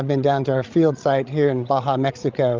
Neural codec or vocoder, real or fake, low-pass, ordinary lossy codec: codec, 16 kHz, 16 kbps, FreqCodec, larger model; fake; 7.2 kHz; Opus, 24 kbps